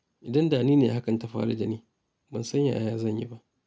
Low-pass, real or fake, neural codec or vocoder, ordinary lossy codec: none; real; none; none